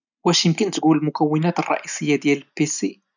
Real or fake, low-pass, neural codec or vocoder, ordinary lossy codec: real; 7.2 kHz; none; none